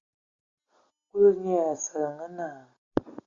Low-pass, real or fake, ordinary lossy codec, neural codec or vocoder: 7.2 kHz; real; Opus, 64 kbps; none